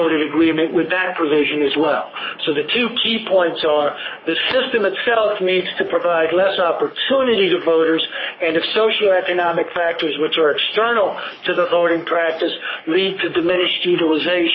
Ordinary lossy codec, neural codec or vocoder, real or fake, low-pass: MP3, 24 kbps; codec, 44.1 kHz, 3.4 kbps, Pupu-Codec; fake; 7.2 kHz